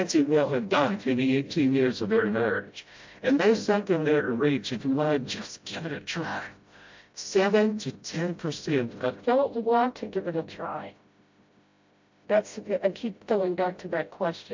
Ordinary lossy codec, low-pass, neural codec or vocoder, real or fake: MP3, 48 kbps; 7.2 kHz; codec, 16 kHz, 0.5 kbps, FreqCodec, smaller model; fake